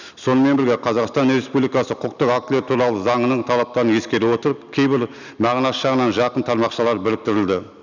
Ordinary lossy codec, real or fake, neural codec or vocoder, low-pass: none; real; none; 7.2 kHz